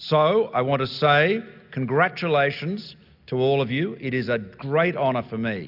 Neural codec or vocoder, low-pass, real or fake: none; 5.4 kHz; real